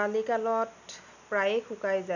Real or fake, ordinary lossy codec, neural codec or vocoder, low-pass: real; none; none; 7.2 kHz